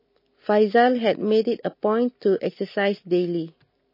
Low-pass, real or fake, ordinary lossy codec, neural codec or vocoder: 5.4 kHz; real; MP3, 24 kbps; none